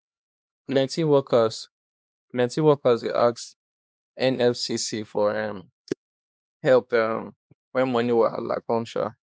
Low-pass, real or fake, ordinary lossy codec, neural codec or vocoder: none; fake; none; codec, 16 kHz, 2 kbps, X-Codec, HuBERT features, trained on LibriSpeech